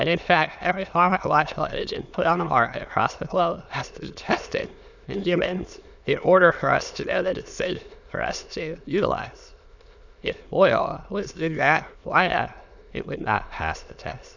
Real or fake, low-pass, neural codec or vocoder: fake; 7.2 kHz; autoencoder, 22.05 kHz, a latent of 192 numbers a frame, VITS, trained on many speakers